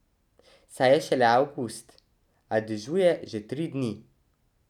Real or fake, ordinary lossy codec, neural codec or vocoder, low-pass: real; none; none; 19.8 kHz